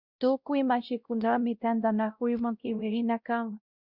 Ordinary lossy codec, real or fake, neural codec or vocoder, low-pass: Opus, 64 kbps; fake; codec, 16 kHz, 0.5 kbps, X-Codec, WavLM features, trained on Multilingual LibriSpeech; 5.4 kHz